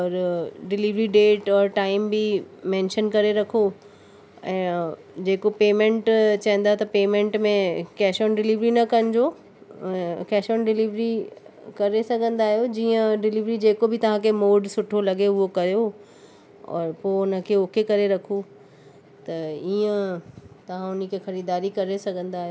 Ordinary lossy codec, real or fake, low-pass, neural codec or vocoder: none; real; none; none